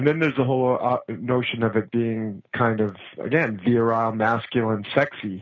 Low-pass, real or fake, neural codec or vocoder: 7.2 kHz; real; none